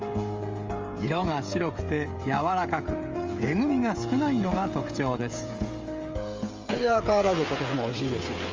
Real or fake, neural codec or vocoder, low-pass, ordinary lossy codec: fake; codec, 16 kHz, 16 kbps, FreqCodec, smaller model; 7.2 kHz; Opus, 32 kbps